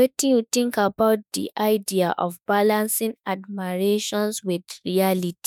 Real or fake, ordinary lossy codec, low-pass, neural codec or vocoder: fake; none; none; autoencoder, 48 kHz, 32 numbers a frame, DAC-VAE, trained on Japanese speech